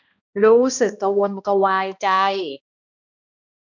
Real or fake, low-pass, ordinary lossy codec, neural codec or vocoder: fake; 7.2 kHz; none; codec, 16 kHz, 1 kbps, X-Codec, HuBERT features, trained on balanced general audio